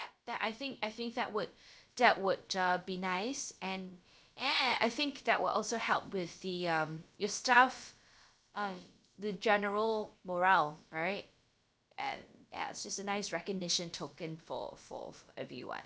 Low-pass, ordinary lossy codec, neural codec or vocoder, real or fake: none; none; codec, 16 kHz, about 1 kbps, DyCAST, with the encoder's durations; fake